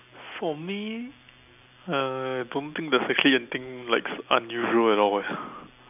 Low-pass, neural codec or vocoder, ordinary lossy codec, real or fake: 3.6 kHz; none; none; real